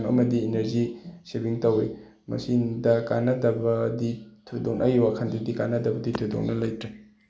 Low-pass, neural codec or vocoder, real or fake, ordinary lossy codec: none; none; real; none